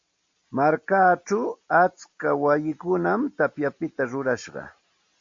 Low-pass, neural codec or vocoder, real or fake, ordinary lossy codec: 7.2 kHz; none; real; MP3, 48 kbps